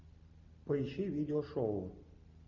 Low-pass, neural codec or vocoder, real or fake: 7.2 kHz; none; real